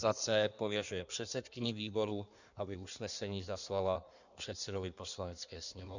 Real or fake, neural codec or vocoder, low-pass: fake; codec, 16 kHz in and 24 kHz out, 1.1 kbps, FireRedTTS-2 codec; 7.2 kHz